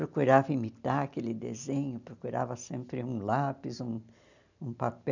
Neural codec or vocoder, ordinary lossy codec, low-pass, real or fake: none; none; 7.2 kHz; real